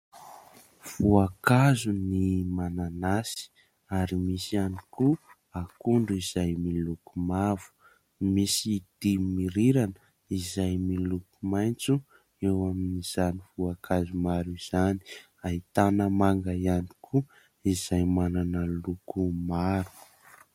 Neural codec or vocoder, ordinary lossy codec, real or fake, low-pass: none; MP3, 64 kbps; real; 19.8 kHz